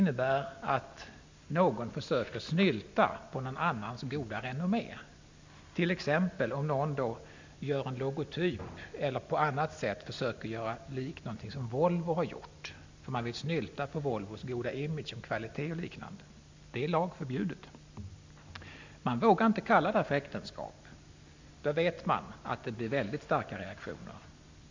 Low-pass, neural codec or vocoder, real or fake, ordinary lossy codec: 7.2 kHz; none; real; MP3, 64 kbps